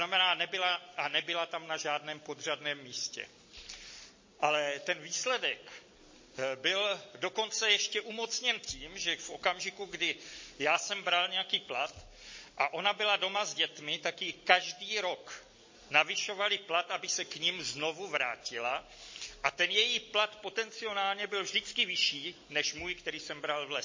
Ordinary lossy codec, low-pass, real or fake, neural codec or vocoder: MP3, 32 kbps; 7.2 kHz; real; none